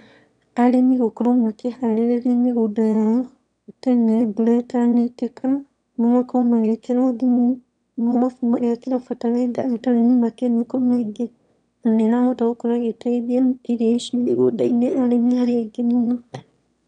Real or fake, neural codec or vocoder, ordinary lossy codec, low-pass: fake; autoencoder, 22.05 kHz, a latent of 192 numbers a frame, VITS, trained on one speaker; none; 9.9 kHz